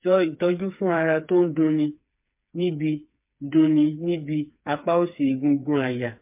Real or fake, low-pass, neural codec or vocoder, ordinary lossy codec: fake; 3.6 kHz; codec, 16 kHz, 4 kbps, FreqCodec, smaller model; MP3, 32 kbps